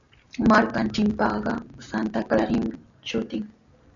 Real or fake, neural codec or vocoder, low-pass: real; none; 7.2 kHz